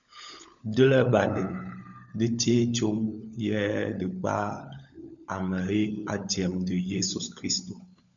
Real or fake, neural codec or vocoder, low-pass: fake; codec, 16 kHz, 16 kbps, FunCodec, trained on LibriTTS, 50 frames a second; 7.2 kHz